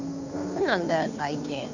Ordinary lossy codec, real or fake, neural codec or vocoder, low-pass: none; fake; codec, 16 kHz, 2 kbps, FunCodec, trained on Chinese and English, 25 frames a second; 7.2 kHz